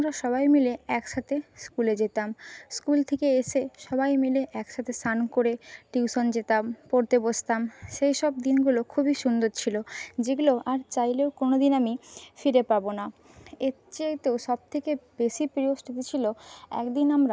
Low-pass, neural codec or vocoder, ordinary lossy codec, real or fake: none; none; none; real